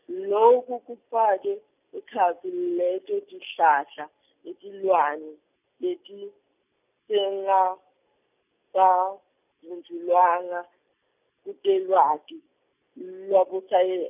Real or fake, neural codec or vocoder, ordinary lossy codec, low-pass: real; none; none; 3.6 kHz